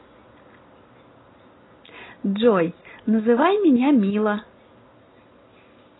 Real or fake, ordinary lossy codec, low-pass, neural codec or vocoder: real; AAC, 16 kbps; 7.2 kHz; none